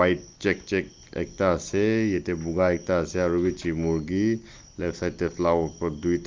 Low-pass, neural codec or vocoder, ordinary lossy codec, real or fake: 7.2 kHz; none; Opus, 24 kbps; real